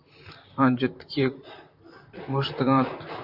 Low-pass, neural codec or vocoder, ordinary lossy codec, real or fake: 5.4 kHz; vocoder, 44.1 kHz, 128 mel bands, Pupu-Vocoder; AAC, 48 kbps; fake